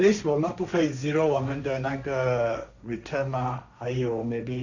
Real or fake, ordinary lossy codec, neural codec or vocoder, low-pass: fake; none; codec, 16 kHz, 1.1 kbps, Voila-Tokenizer; 7.2 kHz